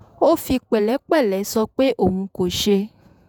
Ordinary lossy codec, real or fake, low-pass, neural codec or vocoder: none; fake; none; vocoder, 48 kHz, 128 mel bands, Vocos